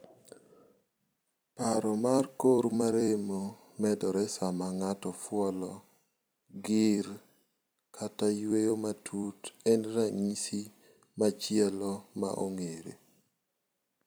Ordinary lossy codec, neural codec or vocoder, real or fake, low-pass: none; vocoder, 44.1 kHz, 128 mel bands every 256 samples, BigVGAN v2; fake; none